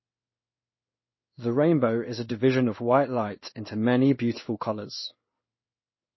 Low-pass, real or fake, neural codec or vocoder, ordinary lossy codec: 7.2 kHz; fake; codec, 16 kHz in and 24 kHz out, 1 kbps, XY-Tokenizer; MP3, 24 kbps